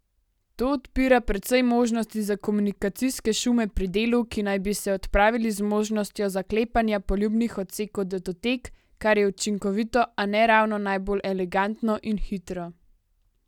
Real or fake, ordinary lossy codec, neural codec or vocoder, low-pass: real; none; none; 19.8 kHz